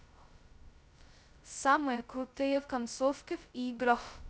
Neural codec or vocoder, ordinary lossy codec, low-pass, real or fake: codec, 16 kHz, 0.2 kbps, FocalCodec; none; none; fake